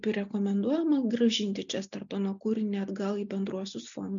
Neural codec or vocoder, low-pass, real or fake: none; 7.2 kHz; real